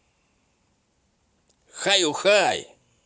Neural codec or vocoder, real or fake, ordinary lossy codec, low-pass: none; real; none; none